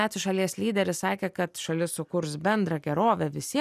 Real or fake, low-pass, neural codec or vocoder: real; 14.4 kHz; none